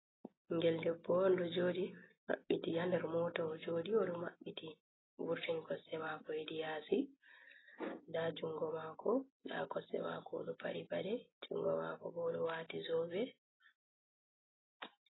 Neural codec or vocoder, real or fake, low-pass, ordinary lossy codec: none; real; 7.2 kHz; AAC, 16 kbps